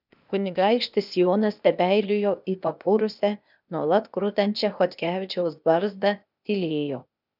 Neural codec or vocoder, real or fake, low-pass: codec, 16 kHz, 0.8 kbps, ZipCodec; fake; 5.4 kHz